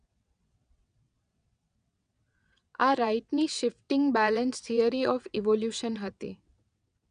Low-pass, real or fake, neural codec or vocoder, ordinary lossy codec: 9.9 kHz; fake; vocoder, 22.05 kHz, 80 mel bands, WaveNeXt; none